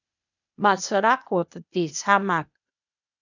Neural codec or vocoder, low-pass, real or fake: codec, 16 kHz, 0.8 kbps, ZipCodec; 7.2 kHz; fake